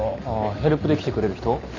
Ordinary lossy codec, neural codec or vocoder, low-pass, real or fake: none; none; 7.2 kHz; real